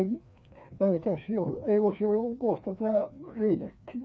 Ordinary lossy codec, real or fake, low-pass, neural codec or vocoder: none; fake; none; codec, 16 kHz, 2 kbps, FreqCodec, larger model